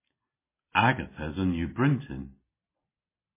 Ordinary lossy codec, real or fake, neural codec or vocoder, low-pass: MP3, 16 kbps; real; none; 3.6 kHz